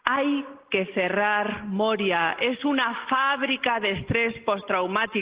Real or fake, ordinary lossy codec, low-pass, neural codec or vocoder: real; Opus, 16 kbps; 3.6 kHz; none